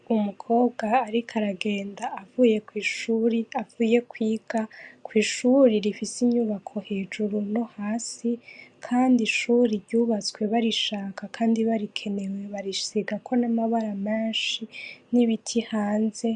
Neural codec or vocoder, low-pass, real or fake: none; 10.8 kHz; real